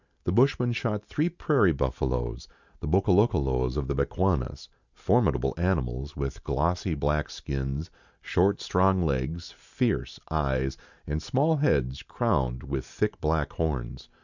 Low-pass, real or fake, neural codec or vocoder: 7.2 kHz; real; none